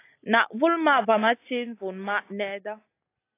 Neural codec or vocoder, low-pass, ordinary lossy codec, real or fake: none; 3.6 kHz; AAC, 24 kbps; real